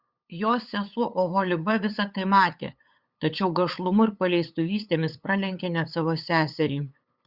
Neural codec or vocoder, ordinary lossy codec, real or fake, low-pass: codec, 16 kHz, 8 kbps, FunCodec, trained on LibriTTS, 25 frames a second; Opus, 64 kbps; fake; 5.4 kHz